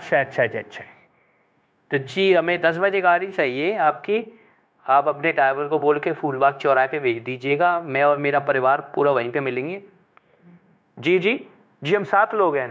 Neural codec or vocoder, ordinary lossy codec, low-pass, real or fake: codec, 16 kHz, 0.9 kbps, LongCat-Audio-Codec; none; none; fake